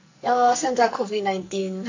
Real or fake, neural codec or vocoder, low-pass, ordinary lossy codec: fake; codec, 44.1 kHz, 2.6 kbps, SNAC; 7.2 kHz; AAC, 48 kbps